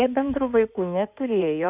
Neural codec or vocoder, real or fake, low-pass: codec, 16 kHz in and 24 kHz out, 2.2 kbps, FireRedTTS-2 codec; fake; 3.6 kHz